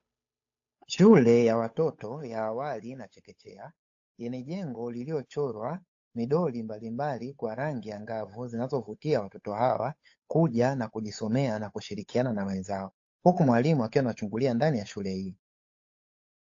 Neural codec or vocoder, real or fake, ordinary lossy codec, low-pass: codec, 16 kHz, 8 kbps, FunCodec, trained on Chinese and English, 25 frames a second; fake; AAC, 48 kbps; 7.2 kHz